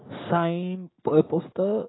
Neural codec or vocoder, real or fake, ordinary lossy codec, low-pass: none; real; AAC, 16 kbps; 7.2 kHz